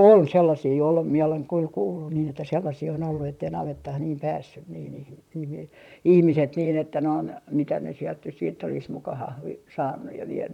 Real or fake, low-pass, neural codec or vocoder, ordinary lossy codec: fake; 19.8 kHz; vocoder, 44.1 kHz, 128 mel bands, Pupu-Vocoder; none